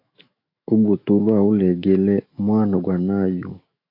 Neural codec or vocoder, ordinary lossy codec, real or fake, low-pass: autoencoder, 48 kHz, 128 numbers a frame, DAC-VAE, trained on Japanese speech; AAC, 32 kbps; fake; 5.4 kHz